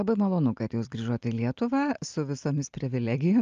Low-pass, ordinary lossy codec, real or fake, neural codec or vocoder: 7.2 kHz; Opus, 32 kbps; real; none